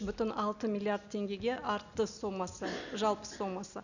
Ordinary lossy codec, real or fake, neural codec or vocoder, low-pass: none; real; none; 7.2 kHz